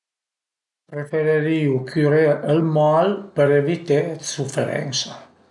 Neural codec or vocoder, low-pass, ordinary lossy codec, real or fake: none; 10.8 kHz; none; real